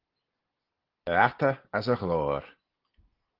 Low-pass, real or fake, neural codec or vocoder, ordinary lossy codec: 5.4 kHz; real; none; Opus, 16 kbps